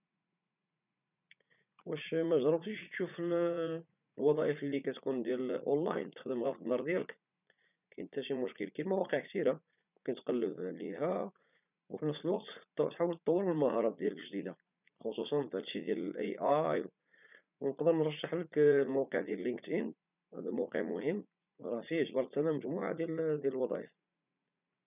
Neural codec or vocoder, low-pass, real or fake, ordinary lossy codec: vocoder, 44.1 kHz, 80 mel bands, Vocos; 3.6 kHz; fake; none